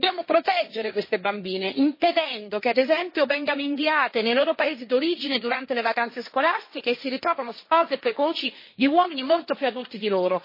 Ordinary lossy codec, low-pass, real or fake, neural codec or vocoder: MP3, 24 kbps; 5.4 kHz; fake; codec, 16 kHz, 1.1 kbps, Voila-Tokenizer